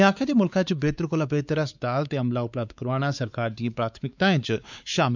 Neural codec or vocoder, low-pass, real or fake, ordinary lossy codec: codec, 16 kHz, 4 kbps, X-Codec, WavLM features, trained on Multilingual LibriSpeech; 7.2 kHz; fake; none